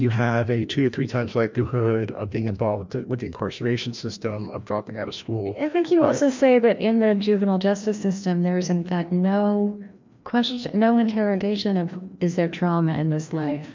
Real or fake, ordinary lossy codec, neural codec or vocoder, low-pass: fake; MP3, 64 kbps; codec, 16 kHz, 1 kbps, FreqCodec, larger model; 7.2 kHz